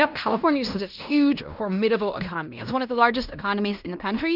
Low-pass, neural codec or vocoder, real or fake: 5.4 kHz; codec, 16 kHz in and 24 kHz out, 0.9 kbps, LongCat-Audio-Codec, fine tuned four codebook decoder; fake